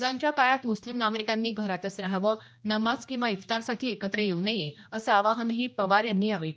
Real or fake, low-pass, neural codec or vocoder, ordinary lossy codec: fake; none; codec, 16 kHz, 1 kbps, X-Codec, HuBERT features, trained on general audio; none